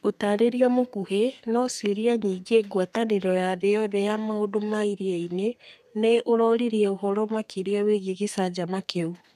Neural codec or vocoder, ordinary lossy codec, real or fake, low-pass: codec, 32 kHz, 1.9 kbps, SNAC; none; fake; 14.4 kHz